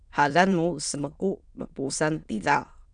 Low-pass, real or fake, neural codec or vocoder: 9.9 kHz; fake; autoencoder, 22.05 kHz, a latent of 192 numbers a frame, VITS, trained on many speakers